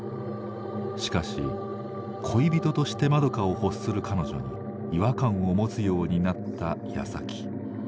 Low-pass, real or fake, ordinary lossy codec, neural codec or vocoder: none; real; none; none